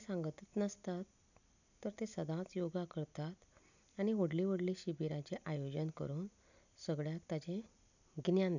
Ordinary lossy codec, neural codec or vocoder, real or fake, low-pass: none; none; real; 7.2 kHz